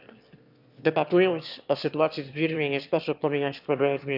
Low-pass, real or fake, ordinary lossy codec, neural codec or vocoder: 5.4 kHz; fake; none; autoencoder, 22.05 kHz, a latent of 192 numbers a frame, VITS, trained on one speaker